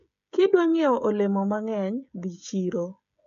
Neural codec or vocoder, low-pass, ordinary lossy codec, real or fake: codec, 16 kHz, 16 kbps, FreqCodec, smaller model; 7.2 kHz; AAC, 96 kbps; fake